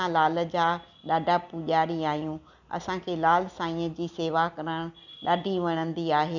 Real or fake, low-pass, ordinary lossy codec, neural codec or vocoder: real; 7.2 kHz; none; none